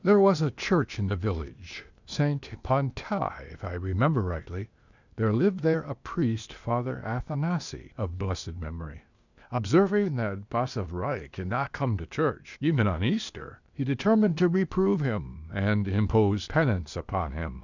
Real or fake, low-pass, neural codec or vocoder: fake; 7.2 kHz; codec, 16 kHz, 0.8 kbps, ZipCodec